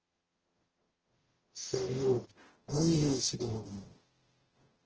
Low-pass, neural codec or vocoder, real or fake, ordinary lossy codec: 7.2 kHz; codec, 44.1 kHz, 0.9 kbps, DAC; fake; Opus, 16 kbps